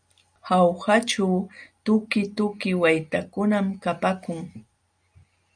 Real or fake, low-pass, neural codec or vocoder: real; 9.9 kHz; none